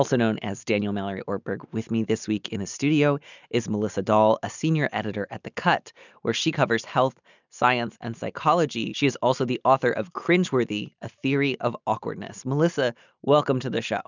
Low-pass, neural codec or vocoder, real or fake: 7.2 kHz; none; real